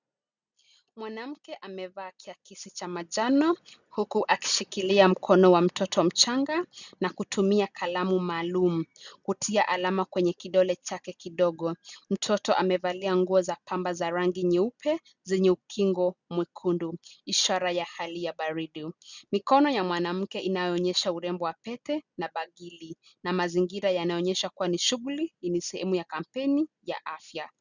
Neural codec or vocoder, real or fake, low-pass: none; real; 7.2 kHz